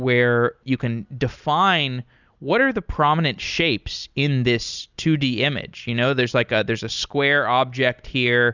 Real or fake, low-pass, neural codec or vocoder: real; 7.2 kHz; none